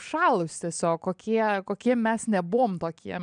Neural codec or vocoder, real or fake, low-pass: none; real; 9.9 kHz